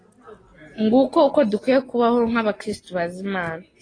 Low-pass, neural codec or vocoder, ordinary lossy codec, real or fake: 9.9 kHz; none; AAC, 32 kbps; real